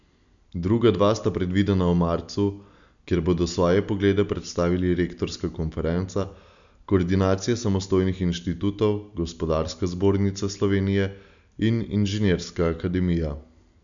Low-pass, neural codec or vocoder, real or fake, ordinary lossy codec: 7.2 kHz; none; real; none